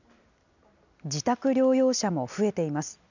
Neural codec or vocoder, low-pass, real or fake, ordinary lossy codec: none; 7.2 kHz; real; none